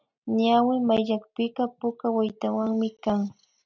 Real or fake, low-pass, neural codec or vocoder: real; 7.2 kHz; none